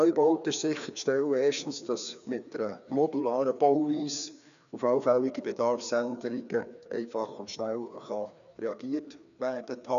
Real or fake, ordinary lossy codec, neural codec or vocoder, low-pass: fake; none; codec, 16 kHz, 2 kbps, FreqCodec, larger model; 7.2 kHz